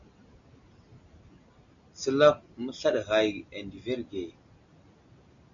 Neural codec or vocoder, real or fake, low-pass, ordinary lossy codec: none; real; 7.2 kHz; MP3, 96 kbps